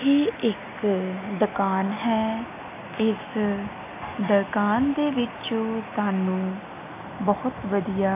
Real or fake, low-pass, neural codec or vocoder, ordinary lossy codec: real; 3.6 kHz; none; AAC, 32 kbps